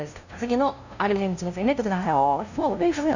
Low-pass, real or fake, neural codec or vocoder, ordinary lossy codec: 7.2 kHz; fake; codec, 16 kHz, 0.5 kbps, FunCodec, trained on LibriTTS, 25 frames a second; none